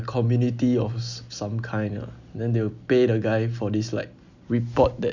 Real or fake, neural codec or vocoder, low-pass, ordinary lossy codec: real; none; 7.2 kHz; none